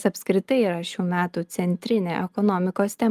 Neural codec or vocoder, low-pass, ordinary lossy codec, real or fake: none; 14.4 kHz; Opus, 32 kbps; real